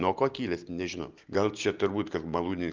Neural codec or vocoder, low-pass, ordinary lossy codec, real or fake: none; 7.2 kHz; Opus, 32 kbps; real